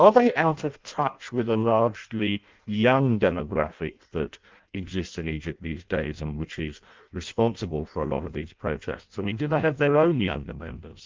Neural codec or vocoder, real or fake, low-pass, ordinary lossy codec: codec, 16 kHz in and 24 kHz out, 0.6 kbps, FireRedTTS-2 codec; fake; 7.2 kHz; Opus, 24 kbps